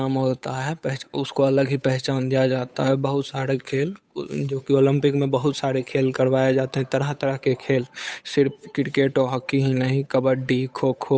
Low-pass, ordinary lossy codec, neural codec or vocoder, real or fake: none; none; codec, 16 kHz, 8 kbps, FunCodec, trained on Chinese and English, 25 frames a second; fake